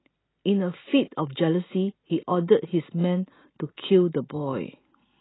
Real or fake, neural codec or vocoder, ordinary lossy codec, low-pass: real; none; AAC, 16 kbps; 7.2 kHz